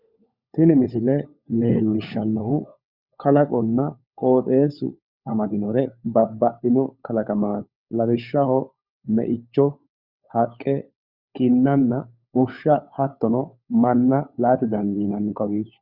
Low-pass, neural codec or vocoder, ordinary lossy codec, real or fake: 5.4 kHz; codec, 16 kHz, 4 kbps, FunCodec, trained on LibriTTS, 50 frames a second; Opus, 64 kbps; fake